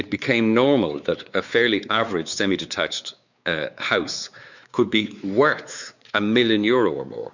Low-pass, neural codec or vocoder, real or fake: 7.2 kHz; codec, 16 kHz, 4 kbps, X-Codec, WavLM features, trained on Multilingual LibriSpeech; fake